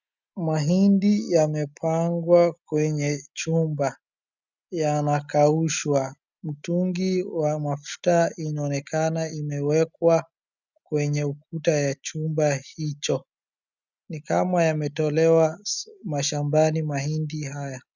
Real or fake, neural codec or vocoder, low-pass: real; none; 7.2 kHz